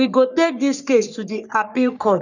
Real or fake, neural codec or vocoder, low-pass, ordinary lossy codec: fake; codec, 44.1 kHz, 3.4 kbps, Pupu-Codec; 7.2 kHz; none